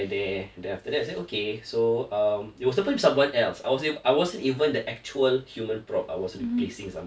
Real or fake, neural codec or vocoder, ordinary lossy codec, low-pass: real; none; none; none